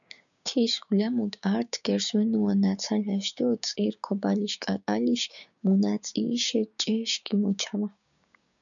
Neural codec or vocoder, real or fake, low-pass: codec, 16 kHz, 6 kbps, DAC; fake; 7.2 kHz